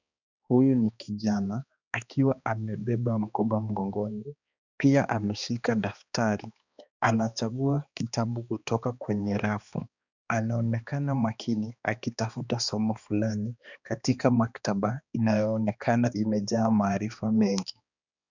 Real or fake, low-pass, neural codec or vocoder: fake; 7.2 kHz; codec, 16 kHz, 2 kbps, X-Codec, HuBERT features, trained on balanced general audio